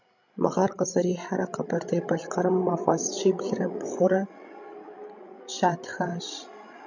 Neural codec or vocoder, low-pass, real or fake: codec, 16 kHz, 16 kbps, FreqCodec, larger model; 7.2 kHz; fake